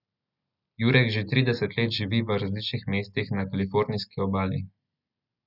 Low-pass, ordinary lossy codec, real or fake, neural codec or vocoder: 5.4 kHz; none; real; none